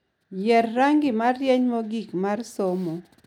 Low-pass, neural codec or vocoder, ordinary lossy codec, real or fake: 19.8 kHz; none; none; real